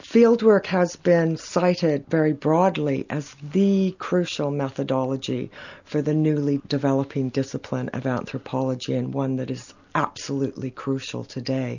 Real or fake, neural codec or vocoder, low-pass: real; none; 7.2 kHz